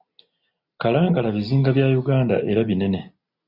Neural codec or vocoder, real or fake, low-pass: none; real; 5.4 kHz